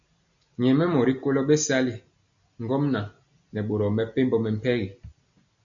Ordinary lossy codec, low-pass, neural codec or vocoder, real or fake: MP3, 96 kbps; 7.2 kHz; none; real